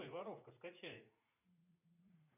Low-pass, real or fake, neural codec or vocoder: 3.6 kHz; fake; vocoder, 44.1 kHz, 80 mel bands, Vocos